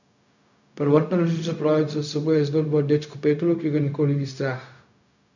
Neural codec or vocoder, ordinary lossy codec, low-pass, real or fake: codec, 16 kHz, 0.4 kbps, LongCat-Audio-Codec; none; 7.2 kHz; fake